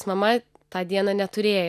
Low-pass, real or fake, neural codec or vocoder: 14.4 kHz; real; none